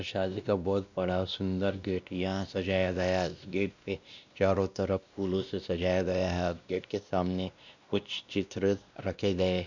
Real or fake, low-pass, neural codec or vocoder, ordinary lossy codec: fake; 7.2 kHz; codec, 16 kHz, 1 kbps, X-Codec, WavLM features, trained on Multilingual LibriSpeech; none